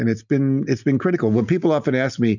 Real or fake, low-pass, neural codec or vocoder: real; 7.2 kHz; none